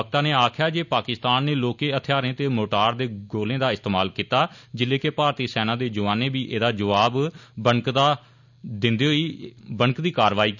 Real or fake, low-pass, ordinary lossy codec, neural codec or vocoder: real; 7.2 kHz; none; none